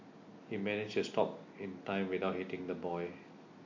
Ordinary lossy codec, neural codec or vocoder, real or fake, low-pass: MP3, 48 kbps; none; real; 7.2 kHz